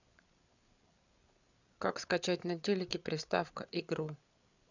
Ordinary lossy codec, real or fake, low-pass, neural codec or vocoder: none; fake; 7.2 kHz; codec, 16 kHz, 8 kbps, FreqCodec, larger model